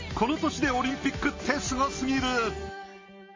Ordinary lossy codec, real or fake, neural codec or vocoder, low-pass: MP3, 32 kbps; real; none; 7.2 kHz